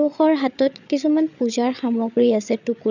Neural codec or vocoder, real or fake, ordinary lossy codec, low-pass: codec, 16 kHz, 8 kbps, FreqCodec, larger model; fake; none; 7.2 kHz